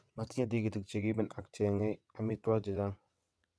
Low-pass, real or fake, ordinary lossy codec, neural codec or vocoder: none; fake; none; vocoder, 22.05 kHz, 80 mel bands, WaveNeXt